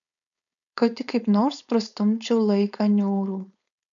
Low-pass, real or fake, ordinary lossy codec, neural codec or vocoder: 7.2 kHz; fake; MP3, 96 kbps; codec, 16 kHz, 4.8 kbps, FACodec